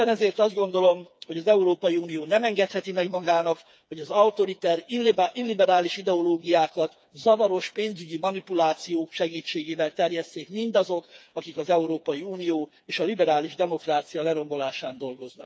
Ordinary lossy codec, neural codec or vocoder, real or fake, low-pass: none; codec, 16 kHz, 4 kbps, FreqCodec, smaller model; fake; none